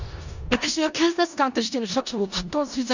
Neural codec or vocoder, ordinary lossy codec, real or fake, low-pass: codec, 16 kHz in and 24 kHz out, 0.4 kbps, LongCat-Audio-Codec, four codebook decoder; none; fake; 7.2 kHz